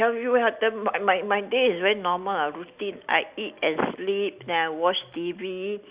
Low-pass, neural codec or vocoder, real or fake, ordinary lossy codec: 3.6 kHz; none; real; Opus, 64 kbps